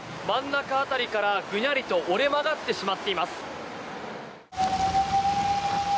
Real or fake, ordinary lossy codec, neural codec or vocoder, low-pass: real; none; none; none